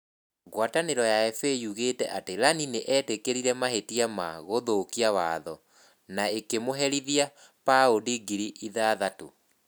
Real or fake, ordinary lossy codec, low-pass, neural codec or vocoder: real; none; none; none